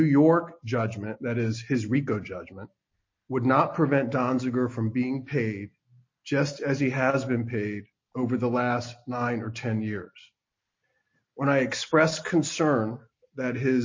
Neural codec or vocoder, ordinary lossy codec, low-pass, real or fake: none; MP3, 32 kbps; 7.2 kHz; real